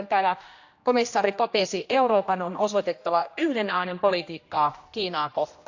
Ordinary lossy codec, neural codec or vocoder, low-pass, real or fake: AAC, 48 kbps; codec, 16 kHz, 1 kbps, X-Codec, HuBERT features, trained on general audio; 7.2 kHz; fake